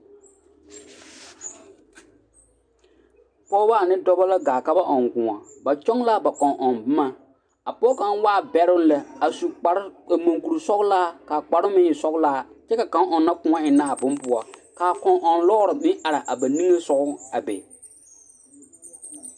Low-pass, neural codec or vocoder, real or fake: 9.9 kHz; none; real